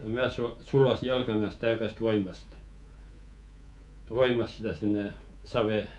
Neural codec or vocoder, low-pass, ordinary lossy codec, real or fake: codec, 24 kHz, 3.1 kbps, DualCodec; 10.8 kHz; none; fake